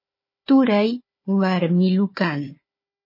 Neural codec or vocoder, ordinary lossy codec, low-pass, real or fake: codec, 16 kHz, 4 kbps, FunCodec, trained on Chinese and English, 50 frames a second; MP3, 24 kbps; 5.4 kHz; fake